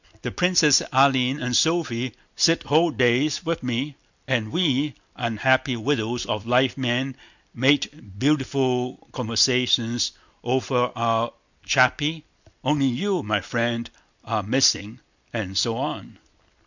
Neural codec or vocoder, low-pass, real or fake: none; 7.2 kHz; real